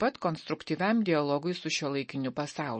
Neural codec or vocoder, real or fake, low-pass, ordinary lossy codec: none; real; 10.8 kHz; MP3, 32 kbps